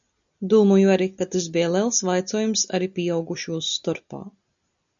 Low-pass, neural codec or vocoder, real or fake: 7.2 kHz; none; real